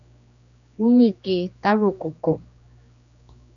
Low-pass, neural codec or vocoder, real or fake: 7.2 kHz; codec, 16 kHz, 2 kbps, X-Codec, HuBERT features, trained on general audio; fake